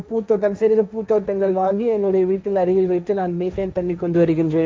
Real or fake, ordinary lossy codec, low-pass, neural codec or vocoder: fake; none; none; codec, 16 kHz, 1.1 kbps, Voila-Tokenizer